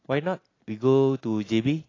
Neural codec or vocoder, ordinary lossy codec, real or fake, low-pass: none; AAC, 32 kbps; real; 7.2 kHz